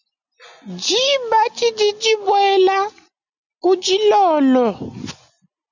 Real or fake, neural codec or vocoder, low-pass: real; none; 7.2 kHz